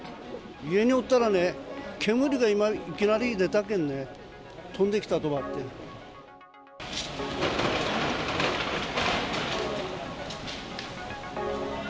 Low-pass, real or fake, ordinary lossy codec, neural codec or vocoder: none; real; none; none